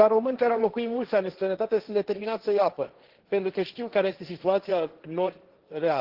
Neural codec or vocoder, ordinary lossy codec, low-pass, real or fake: codec, 16 kHz, 1.1 kbps, Voila-Tokenizer; Opus, 16 kbps; 5.4 kHz; fake